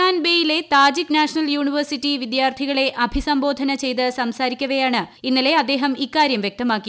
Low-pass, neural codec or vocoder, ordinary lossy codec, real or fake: none; none; none; real